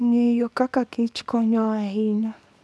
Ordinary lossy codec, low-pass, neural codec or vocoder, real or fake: none; none; codec, 24 kHz, 0.9 kbps, WavTokenizer, small release; fake